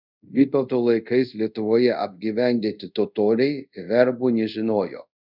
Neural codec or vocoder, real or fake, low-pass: codec, 24 kHz, 0.5 kbps, DualCodec; fake; 5.4 kHz